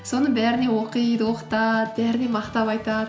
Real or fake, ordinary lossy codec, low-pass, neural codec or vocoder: real; none; none; none